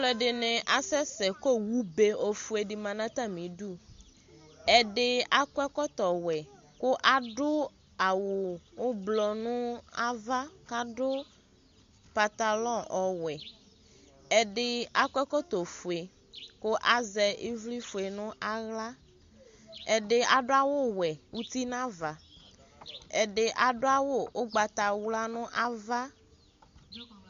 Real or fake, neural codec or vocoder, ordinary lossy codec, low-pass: real; none; MP3, 48 kbps; 7.2 kHz